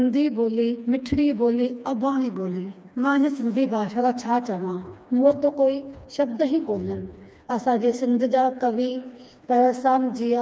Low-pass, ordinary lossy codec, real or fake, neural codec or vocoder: none; none; fake; codec, 16 kHz, 2 kbps, FreqCodec, smaller model